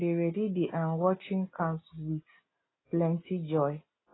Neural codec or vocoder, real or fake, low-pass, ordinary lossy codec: none; real; 7.2 kHz; AAC, 16 kbps